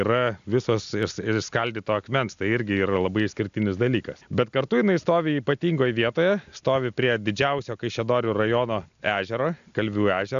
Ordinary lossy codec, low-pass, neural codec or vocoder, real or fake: AAC, 96 kbps; 7.2 kHz; none; real